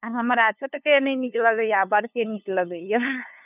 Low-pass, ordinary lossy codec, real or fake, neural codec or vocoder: 3.6 kHz; none; fake; codec, 16 kHz, 2 kbps, FunCodec, trained on LibriTTS, 25 frames a second